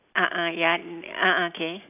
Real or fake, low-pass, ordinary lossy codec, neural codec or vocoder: real; 3.6 kHz; none; none